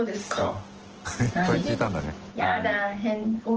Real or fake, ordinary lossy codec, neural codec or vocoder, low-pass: real; Opus, 16 kbps; none; 7.2 kHz